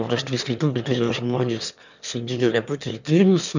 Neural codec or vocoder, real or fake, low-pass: autoencoder, 22.05 kHz, a latent of 192 numbers a frame, VITS, trained on one speaker; fake; 7.2 kHz